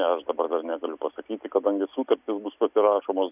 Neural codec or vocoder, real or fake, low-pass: none; real; 3.6 kHz